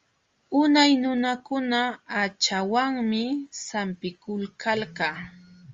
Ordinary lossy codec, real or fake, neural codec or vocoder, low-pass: Opus, 32 kbps; real; none; 7.2 kHz